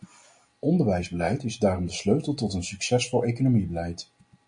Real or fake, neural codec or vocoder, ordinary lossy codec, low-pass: real; none; MP3, 48 kbps; 9.9 kHz